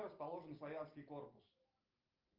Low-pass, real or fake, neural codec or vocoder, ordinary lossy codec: 5.4 kHz; real; none; Opus, 24 kbps